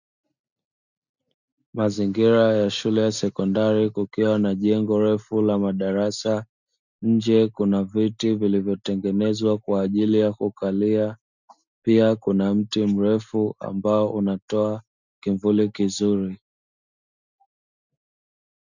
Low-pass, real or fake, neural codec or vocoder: 7.2 kHz; real; none